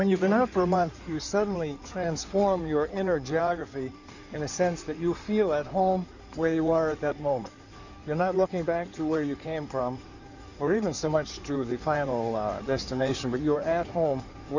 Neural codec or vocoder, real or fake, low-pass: codec, 16 kHz in and 24 kHz out, 2.2 kbps, FireRedTTS-2 codec; fake; 7.2 kHz